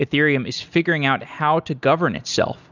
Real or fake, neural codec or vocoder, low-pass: real; none; 7.2 kHz